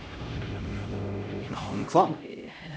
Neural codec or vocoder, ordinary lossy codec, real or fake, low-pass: codec, 16 kHz, 0.5 kbps, X-Codec, HuBERT features, trained on LibriSpeech; none; fake; none